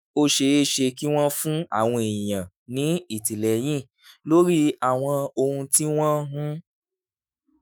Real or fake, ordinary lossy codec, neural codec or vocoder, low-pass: fake; none; autoencoder, 48 kHz, 128 numbers a frame, DAC-VAE, trained on Japanese speech; none